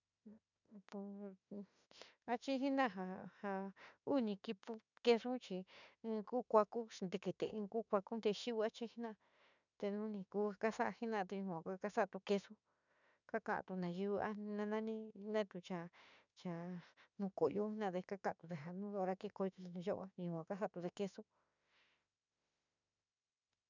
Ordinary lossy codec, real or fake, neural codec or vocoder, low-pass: none; fake; autoencoder, 48 kHz, 32 numbers a frame, DAC-VAE, trained on Japanese speech; 7.2 kHz